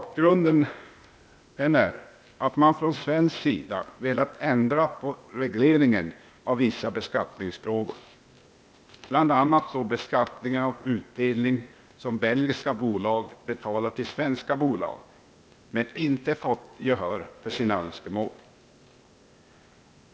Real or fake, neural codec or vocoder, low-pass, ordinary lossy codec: fake; codec, 16 kHz, 0.8 kbps, ZipCodec; none; none